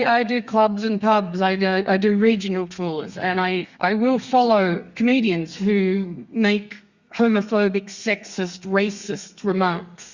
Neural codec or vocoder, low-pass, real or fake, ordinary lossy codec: codec, 32 kHz, 1.9 kbps, SNAC; 7.2 kHz; fake; Opus, 64 kbps